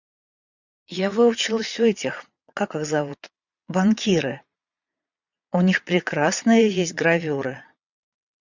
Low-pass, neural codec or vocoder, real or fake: 7.2 kHz; vocoder, 22.05 kHz, 80 mel bands, Vocos; fake